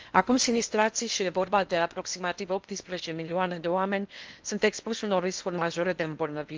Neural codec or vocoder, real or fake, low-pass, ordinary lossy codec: codec, 16 kHz in and 24 kHz out, 0.8 kbps, FocalCodec, streaming, 65536 codes; fake; 7.2 kHz; Opus, 24 kbps